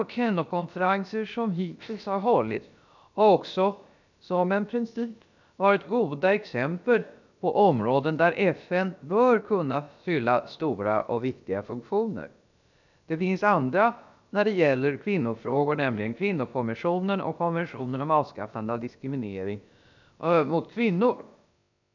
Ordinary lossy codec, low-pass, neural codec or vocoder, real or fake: none; 7.2 kHz; codec, 16 kHz, about 1 kbps, DyCAST, with the encoder's durations; fake